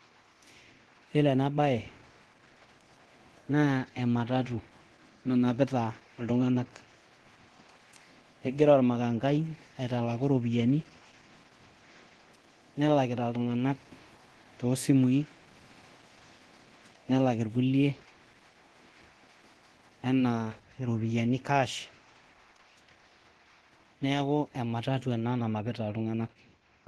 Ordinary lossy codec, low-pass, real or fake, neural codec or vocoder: Opus, 16 kbps; 10.8 kHz; fake; codec, 24 kHz, 0.9 kbps, DualCodec